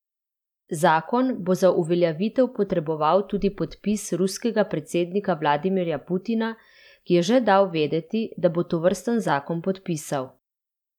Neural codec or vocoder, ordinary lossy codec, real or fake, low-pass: vocoder, 44.1 kHz, 128 mel bands every 512 samples, BigVGAN v2; none; fake; 19.8 kHz